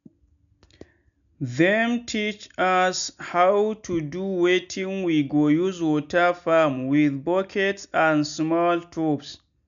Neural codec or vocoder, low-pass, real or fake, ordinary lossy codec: none; 7.2 kHz; real; none